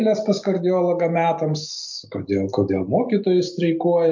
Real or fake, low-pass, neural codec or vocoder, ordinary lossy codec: real; 7.2 kHz; none; MP3, 64 kbps